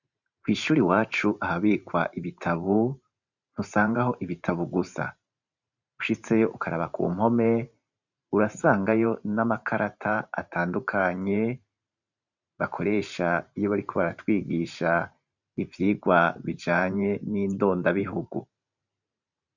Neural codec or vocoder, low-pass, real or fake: vocoder, 44.1 kHz, 128 mel bands every 512 samples, BigVGAN v2; 7.2 kHz; fake